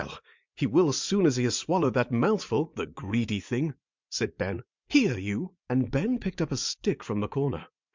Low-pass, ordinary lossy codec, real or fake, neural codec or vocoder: 7.2 kHz; MP3, 64 kbps; fake; vocoder, 44.1 kHz, 80 mel bands, Vocos